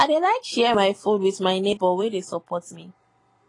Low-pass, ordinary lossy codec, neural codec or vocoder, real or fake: 10.8 kHz; AAC, 32 kbps; vocoder, 44.1 kHz, 128 mel bands every 256 samples, BigVGAN v2; fake